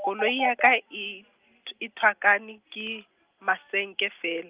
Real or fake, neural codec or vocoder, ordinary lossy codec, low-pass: real; none; Opus, 32 kbps; 3.6 kHz